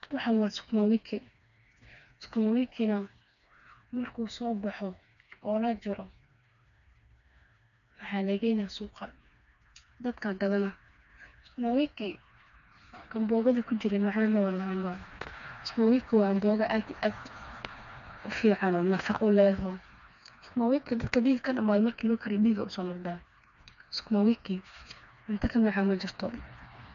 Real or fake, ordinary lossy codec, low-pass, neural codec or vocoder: fake; MP3, 96 kbps; 7.2 kHz; codec, 16 kHz, 2 kbps, FreqCodec, smaller model